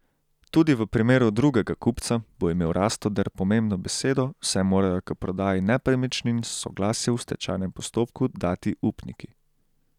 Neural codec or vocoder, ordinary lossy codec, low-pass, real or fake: none; none; 19.8 kHz; real